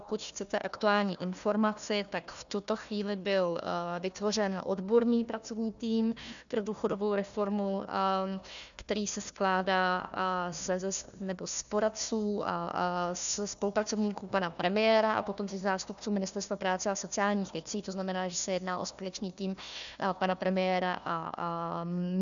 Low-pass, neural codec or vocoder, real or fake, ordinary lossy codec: 7.2 kHz; codec, 16 kHz, 1 kbps, FunCodec, trained on Chinese and English, 50 frames a second; fake; MP3, 96 kbps